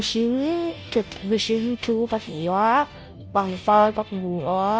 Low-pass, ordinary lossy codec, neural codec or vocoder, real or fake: none; none; codec, 16 kHz, 0.5 kbps, FunCodec, trained on Chinese and English, 25 frames a second; fake